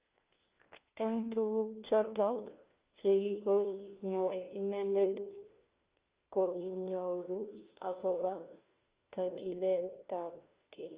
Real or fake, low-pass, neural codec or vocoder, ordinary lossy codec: fake; 3.6 kHz; codec, 16 kHz, 1 kbps, FunCodec, trained on LibriTTS, 50 frames a second; Opus, 24 kbps